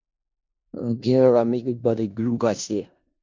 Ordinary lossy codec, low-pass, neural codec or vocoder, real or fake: MP3, 64 kbps; 7.2 kHz; codec, 16 kHz in and 24 kHz out, 0.4 kbps, LongCat-Audio-Codec, four codebook decoder; fake